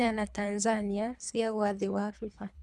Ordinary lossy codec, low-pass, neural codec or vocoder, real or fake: none; none; codec, 24 kHz, 3 kbps, HILCodec; fake